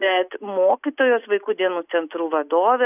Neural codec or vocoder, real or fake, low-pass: none; real; 3.6 kHz